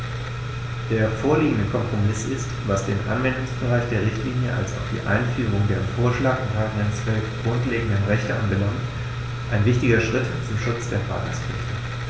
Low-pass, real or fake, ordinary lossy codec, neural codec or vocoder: none; real; none; none